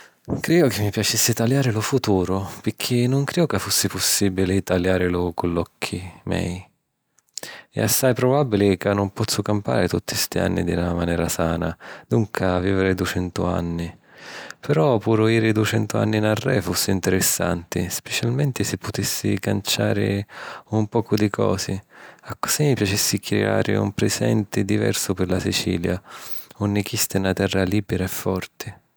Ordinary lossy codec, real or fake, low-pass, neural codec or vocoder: none; real; none; none